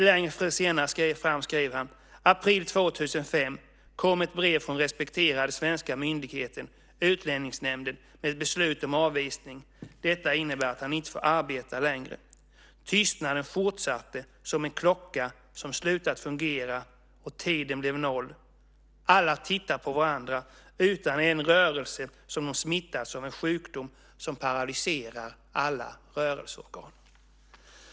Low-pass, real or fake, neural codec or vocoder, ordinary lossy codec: none; real; none; none